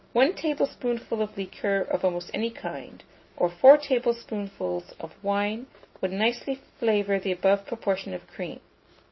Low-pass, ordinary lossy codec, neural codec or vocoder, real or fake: 7.2 kHz; MP3, 24 kbps; none; real